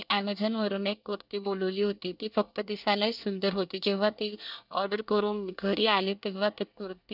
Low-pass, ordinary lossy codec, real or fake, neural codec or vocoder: 5.4 kHz; none; fake; codec, 24 kHz, 1 kbps, SNAC